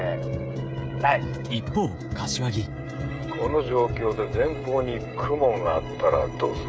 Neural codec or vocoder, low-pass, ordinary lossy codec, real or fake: codec, 16 kHz, 16 kbps, FreqCodec, smaller model; none; none; fake